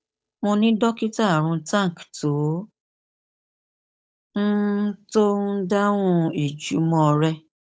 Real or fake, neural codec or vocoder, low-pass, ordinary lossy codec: fake; codec, 16 kHz, 8 kbps, FunCodec, trained on Chinese and English, 25 frames a second; none; none